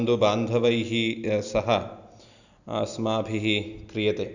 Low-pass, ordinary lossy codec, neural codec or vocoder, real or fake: 7.2 kHz; none; none; real